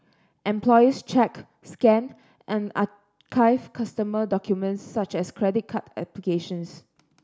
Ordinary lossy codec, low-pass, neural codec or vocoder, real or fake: none; none; none; real